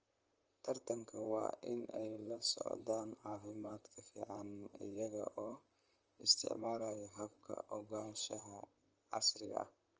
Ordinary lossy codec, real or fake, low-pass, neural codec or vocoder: Opus, 24 kbps; fake; 7.2 kHz; vocoder, 44.1 kHz, 128 mel bands, Pupu-Vocoder